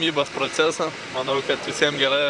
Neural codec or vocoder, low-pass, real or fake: vocoder, 44.1 kHz, 128 mel bands, Pupu-Vocoder; 10.8 kHz; fake